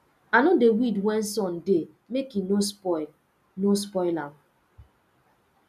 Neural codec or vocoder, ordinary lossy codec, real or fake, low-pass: none; none; real; 14.4 kHz